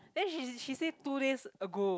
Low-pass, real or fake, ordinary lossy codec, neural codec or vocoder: none; real; none; none